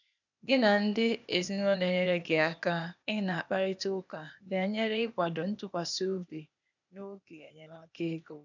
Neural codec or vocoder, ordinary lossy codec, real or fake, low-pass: codec, 16 kHz, 0.8 kbps, ZipCodec; none; fake; 7.2 kHz